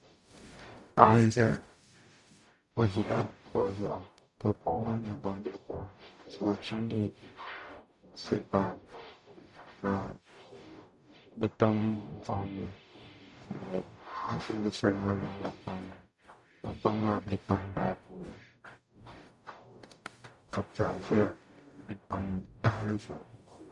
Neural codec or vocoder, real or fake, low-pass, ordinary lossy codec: codec, 44.1 kHz, 0.9 kbps, DAC; fake; 10.8 kHz; MP3, 64 kbps